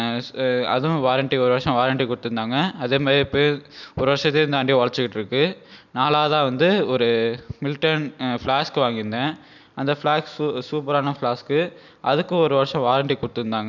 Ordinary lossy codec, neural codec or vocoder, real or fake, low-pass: none; none; real; 7.2 kHz